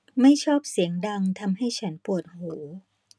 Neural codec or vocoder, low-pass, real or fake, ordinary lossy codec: none; none; real; none